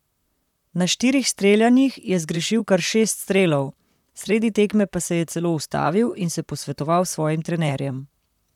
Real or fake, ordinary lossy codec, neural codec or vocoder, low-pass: fake; none; vocoder, 44.1 kHz, 128 mel bands, Pupu-Vocoder; 19.8 kHz